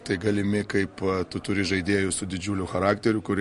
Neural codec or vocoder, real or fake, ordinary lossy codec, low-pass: none; real; MP3, 48 kbps; 14.4 kHz